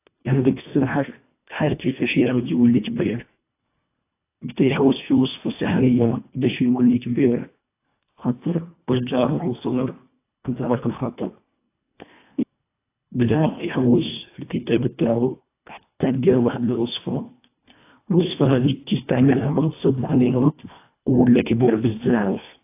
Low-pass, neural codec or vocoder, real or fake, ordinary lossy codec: 3.6 kHz; codec, 24 kHz, 1.5 kbps, HILCodec; fake; AAC, 24 kbps